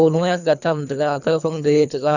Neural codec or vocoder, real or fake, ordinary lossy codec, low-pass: codec, 24 kHz, 3 kbps, HILCodec; fake; none; 7.2 kHz